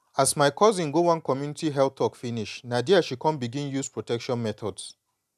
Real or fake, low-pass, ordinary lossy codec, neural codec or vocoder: real; 14.4 kHz; none; none